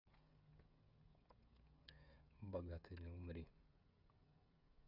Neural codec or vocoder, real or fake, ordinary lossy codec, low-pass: codec, 16 kHz, 16 kbps, FreqCodec, smaller model; fake; none; 5.4 kHz